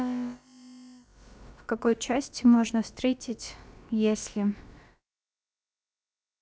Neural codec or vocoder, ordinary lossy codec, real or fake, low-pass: codec, 16 kHz, about 1 kbps, DyCAST, with the encoder's durations; none; fake; none